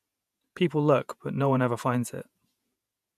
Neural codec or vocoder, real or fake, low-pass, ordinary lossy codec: vocoder, 48 kHz, 128 mel bands, Vocos; fake; 14.4 kHz; none